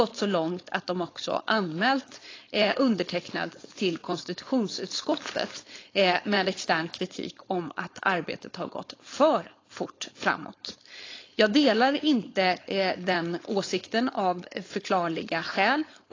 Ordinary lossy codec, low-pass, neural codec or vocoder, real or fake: AAC, 32 kbps; 7.2 kHz; codec, 16 kHz, 4.8 kbps, FACodec; fake